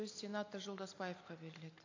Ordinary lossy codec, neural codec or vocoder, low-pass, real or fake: AAC, 48 kbps; none; 7.2 kHz; real